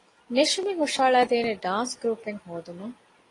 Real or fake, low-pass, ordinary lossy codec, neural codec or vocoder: real; 10.8 kHz; AAC, 32 kbps; none